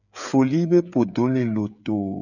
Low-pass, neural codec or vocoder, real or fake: 7.2 kHz; codec, 16 kHz, 16 kbps, FreqCodec, smaller model; fake